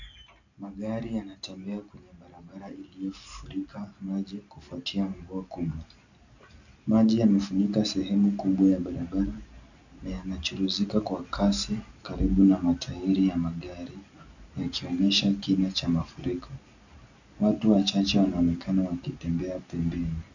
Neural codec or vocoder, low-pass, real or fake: none; 7.2 kHz; real